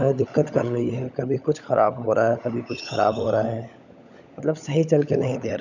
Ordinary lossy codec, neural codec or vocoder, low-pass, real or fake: none; codec, 16 kHz, 16 kbps, FunCodec, trained on LibriTTS, 50 frames a second; 7.2 kHz; fake